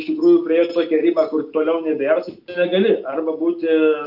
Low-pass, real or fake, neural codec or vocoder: 5.4 kHz; real; none